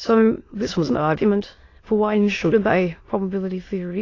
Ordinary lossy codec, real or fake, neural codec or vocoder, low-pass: AAC, 32 kbps; fake; autoencoder, 22.05 kHz, a latent of 192 numbers a frame, VITS, trained on many speakers; 7.2 kHz